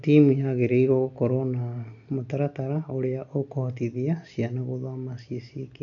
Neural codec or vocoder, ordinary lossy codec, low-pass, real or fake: none; none; 7.2 kHz; real